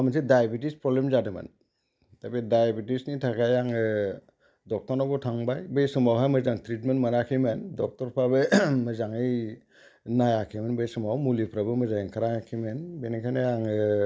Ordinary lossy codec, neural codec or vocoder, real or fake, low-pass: none; none; real; none